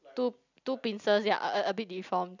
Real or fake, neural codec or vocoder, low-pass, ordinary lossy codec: real; none; 7.2 kHz; none